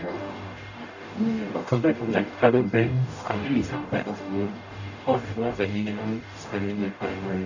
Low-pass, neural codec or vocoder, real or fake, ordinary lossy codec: 7.2 kHz; codec, 44.1 kHz, 0.9 kbps, DAC; fake; none